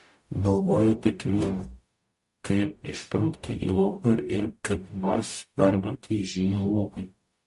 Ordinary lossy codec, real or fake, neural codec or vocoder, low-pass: MP3, 48 kbps; fake; codec, 44.1 kHz, 0.9 kbps, DAC; 14.4 kHz